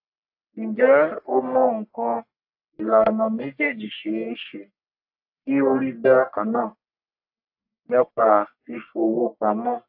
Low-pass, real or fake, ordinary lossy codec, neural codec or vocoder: 5.4 kHz; fake; AAC, 48 kbps; codec, 44.1 kHz, 1.7 kbps, Pupu-Codec